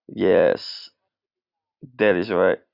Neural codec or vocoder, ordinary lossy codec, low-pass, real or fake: none; Opus, 64 kbps; 5.4 kHz; real